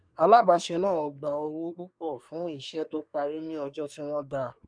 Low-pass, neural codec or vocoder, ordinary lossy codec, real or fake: 9.9 kHz; codec, 24 kHz, 1 kbps, SNAC; none; fake